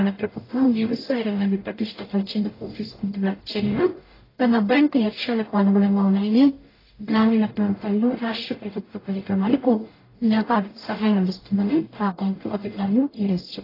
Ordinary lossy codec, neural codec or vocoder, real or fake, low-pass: AAC, 24 kbps; codec, 44.1 kHz, 0.9 kbps, DAC; fake; 5.4 kHz